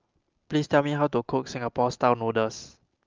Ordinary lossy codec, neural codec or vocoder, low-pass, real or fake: Opus, 24 kbps; none; 7.2 kHz; real